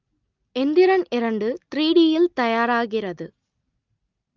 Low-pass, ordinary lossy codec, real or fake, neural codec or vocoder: 7.2 kHz; Opus, 24 kbps; real; none